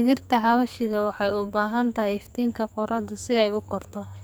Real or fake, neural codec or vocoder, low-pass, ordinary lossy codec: fake; codec, 44.1 kHz, 2.6 kbps, SNAC; none; none